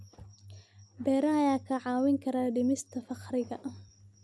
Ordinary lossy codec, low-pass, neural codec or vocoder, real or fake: none; none; none; real